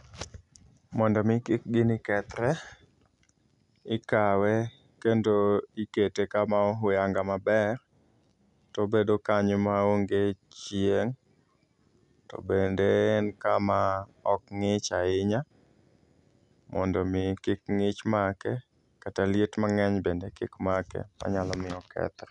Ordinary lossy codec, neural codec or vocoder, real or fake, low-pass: none; none; real; 10.8 kHz